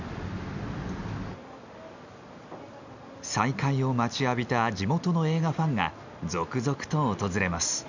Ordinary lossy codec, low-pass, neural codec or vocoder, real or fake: none; 7.2 kHz; none; real